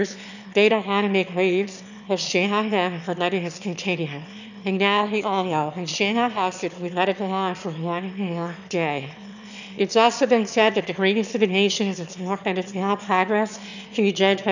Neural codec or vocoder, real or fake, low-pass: autoencoder, 22.05 kHz, a latent of 192 numbers a frame, VITS, trained on one speaker; fake; 7.2 kHz